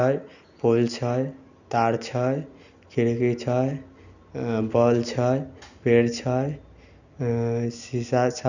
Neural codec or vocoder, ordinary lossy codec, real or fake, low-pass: none; none; real; 7.2 kHz